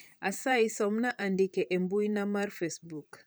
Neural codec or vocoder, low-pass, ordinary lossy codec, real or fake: vocoder, 44.1 kHz, 128 mel bands, Pupu-Vocoder; none; none; fake